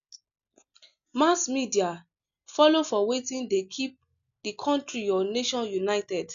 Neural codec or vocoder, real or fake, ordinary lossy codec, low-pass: none; real; none; 7.2 kHz